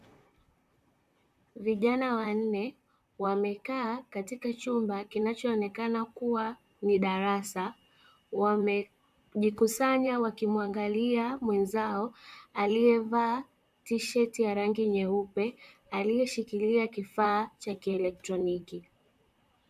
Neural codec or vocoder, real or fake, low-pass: vocoder, 44.1 kHz, 128 mel bands, Pupu-Vocoder; fake; 14.4 kHz